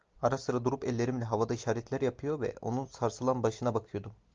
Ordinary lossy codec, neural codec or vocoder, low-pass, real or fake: Opus, 24 kbps; none; 7.2 kHz; real